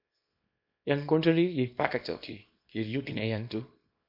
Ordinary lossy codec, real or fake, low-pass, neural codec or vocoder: MP3, 32 kbps; fake; 5.4 kHz; codec, 24 kHz, 0.9 kbps, WavTokenizer, small release